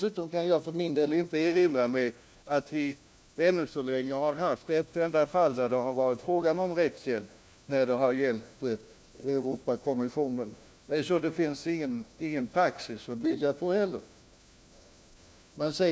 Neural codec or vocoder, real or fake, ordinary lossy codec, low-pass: codec, 16 kHz, 1 kbps, FunCodec, trained on LibriTTS, 50 frames a second; fake; none; none